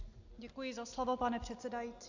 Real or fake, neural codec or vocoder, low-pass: real; none; 7.2 kHz